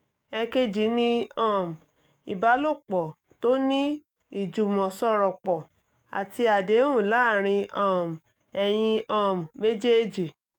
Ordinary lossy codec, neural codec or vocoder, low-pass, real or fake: none; codec, 44.1 kHz, 7.8 kbps, DAC; 19.8 kHz; fake